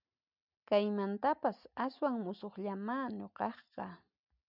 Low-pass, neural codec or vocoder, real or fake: 5.4 kHz; none; real